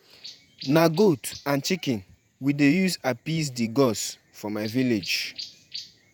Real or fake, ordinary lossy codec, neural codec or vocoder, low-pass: fake; none; vocoder, 48 kHz, 128 mel bands, Vocos; none